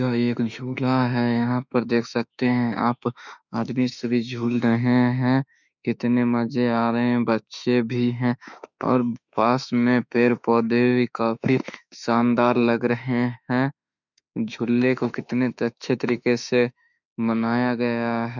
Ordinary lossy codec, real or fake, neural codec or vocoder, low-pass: none; fake; autoencoder, 48 kHz, 32 numbers a frame, DAC-VAE, trained on Japanese speech; 7.2 kHz